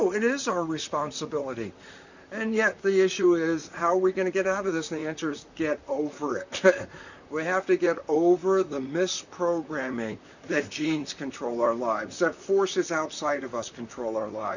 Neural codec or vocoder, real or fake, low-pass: vocoder, 44.1 kHz, 128 mel bands, Pupu-Vocoder; fake; 7.2 kHz